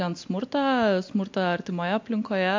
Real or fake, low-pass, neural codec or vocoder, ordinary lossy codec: real; 7.2 kHz; none; MP3, 48 kbps